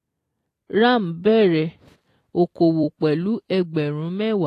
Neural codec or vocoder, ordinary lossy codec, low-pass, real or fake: vocoder, 44.1 kHz, 128 mel bands every 256 samples, BigVGAN v2; AAC, 48 kbps; 14.4 kHz; fake